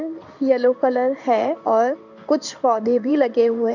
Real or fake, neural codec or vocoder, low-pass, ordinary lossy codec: fake; codec, 16 kHz in and 24 kHz out, 1 kbps, XY-Tokenizer; 7.2 kHz; none